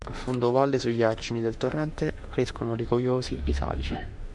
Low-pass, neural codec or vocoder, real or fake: 10.8 kHz; autoencoder, 48 kHz, 32 numbers a frame, DAC-VAE, trained on Japanese speech; fake